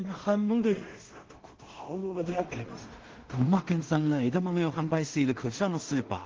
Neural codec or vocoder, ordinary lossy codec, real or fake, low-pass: codec, 16 kHz in and 24 kHz out, 0.4 kbps, LongCat-Audio-Codec, two codebook decoder; Opus, 16 kbps; fake; 7.2 kHz